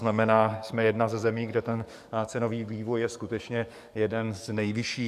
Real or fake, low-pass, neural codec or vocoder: fake; 14.4 kHz; codec, 44.1 kHz, 7.8 kbps, DAC